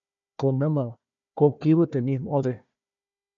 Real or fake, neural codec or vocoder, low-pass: fake; codec, 16 kHz, 1 kbps, FunCodec, trained on Chinese and English, 50 frames a second; 7.2 kHz